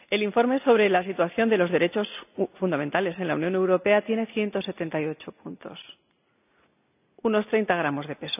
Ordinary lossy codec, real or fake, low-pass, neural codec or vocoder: none; real; 3.6 kHz; none